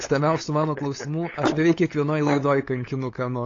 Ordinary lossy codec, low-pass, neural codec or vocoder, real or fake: AAC, 32 kbps; 7.2 kHz; codec, 16 kHz, 8 kbps, FunCodec, trained on LibriTTS, 25 frames a second; fake